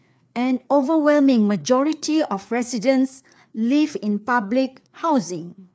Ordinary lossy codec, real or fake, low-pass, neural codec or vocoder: none; fake; none; codec, 16 kHz, 4 kbps, FreqCodec, larger model